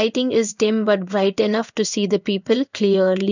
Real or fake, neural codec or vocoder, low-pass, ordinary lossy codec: fake; codec, 16 kHz in and 24 kHz out, 1 kbps, XY-Tokenizer; 7.2 kHz; none